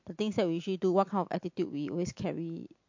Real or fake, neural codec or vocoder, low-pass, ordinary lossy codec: real; none; 7.2 kHz; MP3, 48 kbps